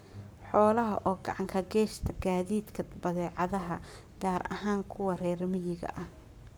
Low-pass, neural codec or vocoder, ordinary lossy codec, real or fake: none; codec, 44.1 kHz, 7.8 kbps, Pupu-Codec; none; fake